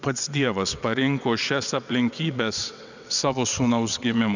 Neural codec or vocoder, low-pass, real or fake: vocoder, 22.05 kHz, 80 mel bands, WaveNeXt; 7.2 kHz; fake